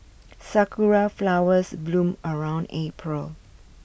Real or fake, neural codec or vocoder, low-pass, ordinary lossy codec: real; none; none; none